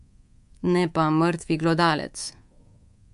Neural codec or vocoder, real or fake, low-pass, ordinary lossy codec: codec, 24 kHz, 3.1 kbps, DualCodec; fake; 10.8 kHz; MP3, 64 kbps